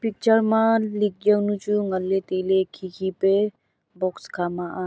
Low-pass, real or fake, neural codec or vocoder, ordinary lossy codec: none; real; none; none